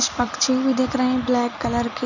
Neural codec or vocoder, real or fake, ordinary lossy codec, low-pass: none; real; none; 7.2 kHz